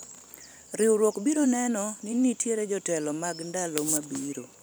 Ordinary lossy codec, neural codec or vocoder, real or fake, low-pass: none; vocoder, 44.1 kHz, 128 mel bands every 512 samples, BigVGAN v2; fake; none